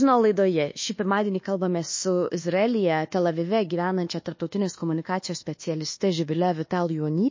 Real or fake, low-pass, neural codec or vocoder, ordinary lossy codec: fake; 7.2 kHz; codec, 24 kHz, 1.2 kbps, DualCodec; MP3, 32 kbps